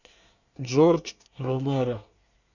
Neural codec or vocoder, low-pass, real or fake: codec, 24 kHz, 1 kbps, SNAC; 7.2 kHz; fake